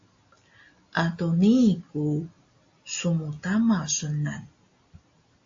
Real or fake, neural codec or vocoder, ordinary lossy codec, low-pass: real; none; AAC, 48 kbps; 7.2 kHz